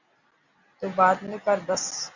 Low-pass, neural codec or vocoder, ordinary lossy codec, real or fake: 7.2 kHz; none; Opus, 64 kbps; real